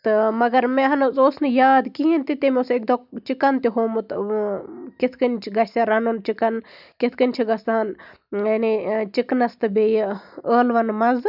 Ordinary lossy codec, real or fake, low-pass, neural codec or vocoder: none; real; 5.4 kHz; none